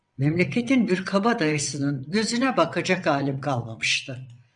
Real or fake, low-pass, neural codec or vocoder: fake; 9.9 kHz; vocoder, 22.05 kHz, 80 mel bands, WaveNeXt